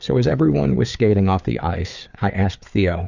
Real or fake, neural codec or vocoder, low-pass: fake; codec, 16 kHz, 4 kbps, FreqCodec, larger model; 7.2 kHz